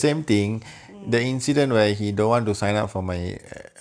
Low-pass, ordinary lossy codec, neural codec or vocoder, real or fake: 9.9 kHz; none; none; real